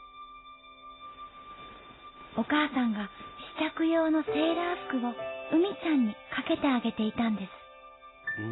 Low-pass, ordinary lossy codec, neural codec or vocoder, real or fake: 7.2 kHz; AAC, 16 kbps; none; real